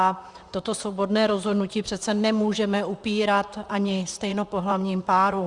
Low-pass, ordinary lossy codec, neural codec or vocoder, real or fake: 10.8 kHz; Opus, 64 kbps; vocoder, 44.1 kHz, 128 mel bands, Pupu-Vocoder; fake